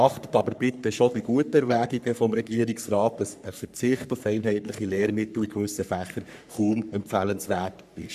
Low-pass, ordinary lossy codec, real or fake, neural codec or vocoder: 14.4 kHz; none; fake; codec, 44.1 kHz, 3.4 kbps, Pupu-Codec